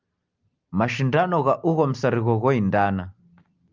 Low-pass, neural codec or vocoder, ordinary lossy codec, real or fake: 7.2 kHz; none; Opus, 24 kbps; real